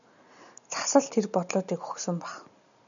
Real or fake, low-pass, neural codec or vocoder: real; 7.2 kHz; none